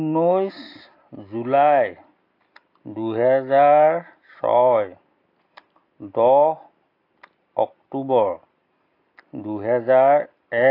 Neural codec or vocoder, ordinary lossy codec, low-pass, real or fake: none; AAC, 32 kbps; 5.4 kHz; real